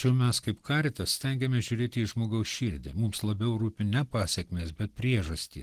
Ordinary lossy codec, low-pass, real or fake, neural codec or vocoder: Opus, 16 kbps; 14.4 kHz; real; none